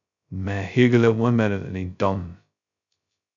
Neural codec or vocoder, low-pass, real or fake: codec, 16 kHz, 0.2 kbps, FocalCodec; 7.2 kHz; fake